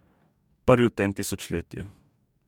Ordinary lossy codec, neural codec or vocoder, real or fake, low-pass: MP3, 96 kbps; codec, 44.1 kHz, 2.6 kbps, DAC; fake; 19.8 kHz